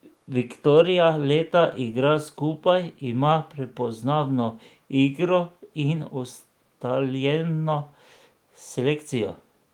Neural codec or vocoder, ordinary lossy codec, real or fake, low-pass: autoencoder, 48 kHz, 128 numbers a frame, DAC-VAE, trained on Japanese speech; Opus, 24 kbps; fake; 19.8 kHz